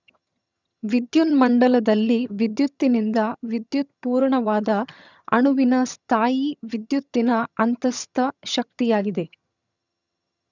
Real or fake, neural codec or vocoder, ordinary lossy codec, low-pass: fake; vocoder, 22.05 kHz, 80 mel bands, HiFi-GAN; none; 7.2 kHz